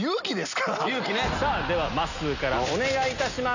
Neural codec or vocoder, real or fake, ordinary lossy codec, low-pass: none; real; none; 7.2 kHz